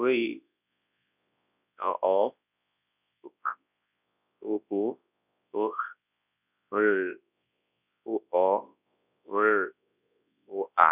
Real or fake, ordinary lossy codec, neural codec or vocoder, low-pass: fake; none; codec, 24 kHz, 0.9 kbps, WavTokenizer, large speech release; 3.6 kHz